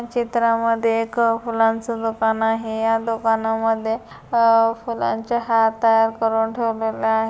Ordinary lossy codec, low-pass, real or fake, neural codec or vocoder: none; none; real; none